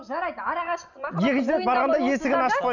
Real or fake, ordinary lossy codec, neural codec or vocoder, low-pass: real; none; none; 7.2 kHz